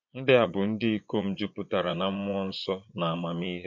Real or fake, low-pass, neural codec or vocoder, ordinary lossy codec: fake; 7.2 kHz; vocoder, 22.05 kHz, 80 mel bands, Vocos; MP3, 48 kbps